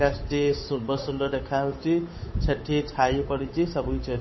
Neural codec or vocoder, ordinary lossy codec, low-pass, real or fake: codec, 16 kHz, 8 kbps, FunCodec, trained on Chinese and English, 25 frames a second; MP3, 24 kbps; 7.2 kHz; fake